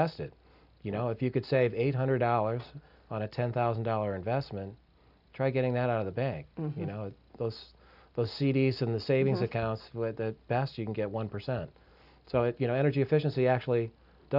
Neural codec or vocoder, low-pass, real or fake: none; 5.4 kHz; real